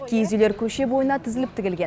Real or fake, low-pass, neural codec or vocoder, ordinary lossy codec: real; none; none; none